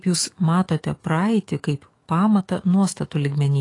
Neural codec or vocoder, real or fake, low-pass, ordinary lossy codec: autoencoder, 48 kHz, 128 numbers a frame, DAC-VAE, trained on Japanese speech; fake; 10.8 kHz; AAC, 32 kbps